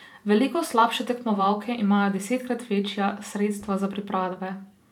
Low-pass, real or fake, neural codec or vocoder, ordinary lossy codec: 19.8 kHz; fake; vocoder, 44.1 kHz, 128 mel bands every 512 samples, BigVGAN v2; none